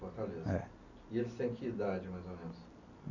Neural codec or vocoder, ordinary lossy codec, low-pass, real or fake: none; none; 7.2 kHz; real